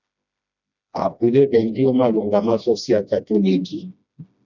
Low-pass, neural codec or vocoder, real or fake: 7.2 kHz; codec, 16 kHz, 1 kbps, FreqCodec, smaller model; fake